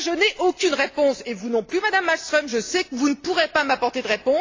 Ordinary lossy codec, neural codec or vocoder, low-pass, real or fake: AAC, 32 kbps; none; 7.2 kHz; real